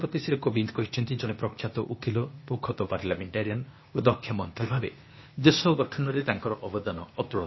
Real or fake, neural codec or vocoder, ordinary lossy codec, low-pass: fake; codec, 16 kHz, 0.8 kbps, ZipCodec; MP3, 24 kbps; 7.2 kHz